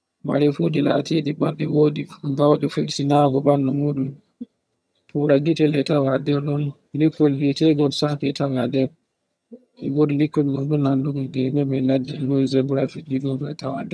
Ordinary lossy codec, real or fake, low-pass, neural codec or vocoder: none; fake; none; vocoder, 22.05 kHz, 80 mel bands, HiFi-GAN